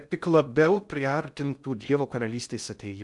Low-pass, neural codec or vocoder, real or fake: 10.8 kHz; codec, 16 kHz in and 24 kHz out, 0.6 kbps, FocalCodec, streaming, 2048 codes; fake